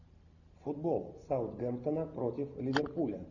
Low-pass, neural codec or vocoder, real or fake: 7.2 kHz; none; real